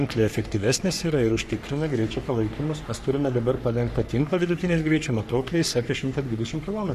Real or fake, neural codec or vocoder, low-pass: fake; codec, 44.1 kHz, 3.4 kbps, Pupu-Codec; 14.4 kHz